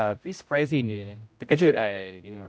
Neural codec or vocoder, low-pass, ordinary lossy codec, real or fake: codec, 16 kHz, 0.5 kbps, X-Codec, HuBERT features, trained on general audio; none; none; fake